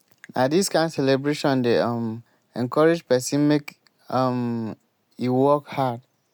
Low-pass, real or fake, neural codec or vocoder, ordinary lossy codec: none; real; none; none